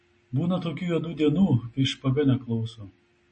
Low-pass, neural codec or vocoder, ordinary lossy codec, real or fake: 10.8 kHz; none; MP3, 32 kbps; real